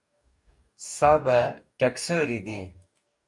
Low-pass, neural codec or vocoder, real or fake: 10.8 kHz; codec, 44.1 kHz, 2.6 kbps, DAC; fake